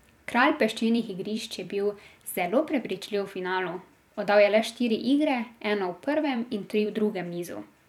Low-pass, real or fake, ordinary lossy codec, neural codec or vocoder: 19.8 kHz; fake; none; vocoder, 44.1 kHz, 128 mel bands every 256 samples, BigVGAN v2